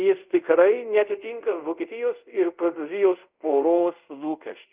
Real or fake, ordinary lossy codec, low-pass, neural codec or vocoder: fake; Opus, 24 kbps; 3.6 kHz; codec, 24 kHz, 0.5 kbps, DualCodec